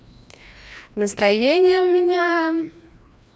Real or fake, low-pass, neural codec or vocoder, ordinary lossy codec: fake; none; codec, 16 kHz, 1 kbps, FreqCodec, larger model; none